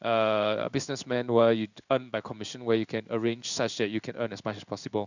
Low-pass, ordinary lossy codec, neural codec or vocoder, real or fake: 7.2 kHz; none; codec, 16 kHz in and 24 kHz out, 1 kbps, XY-Tokenizer; fake